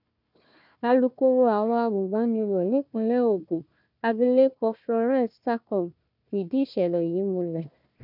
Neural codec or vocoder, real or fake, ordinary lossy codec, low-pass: codec, 16 kHz, 1 kbps, FunCodec, trained on Chinese and English, 50 frames a second; fake; none; 5.4 kHz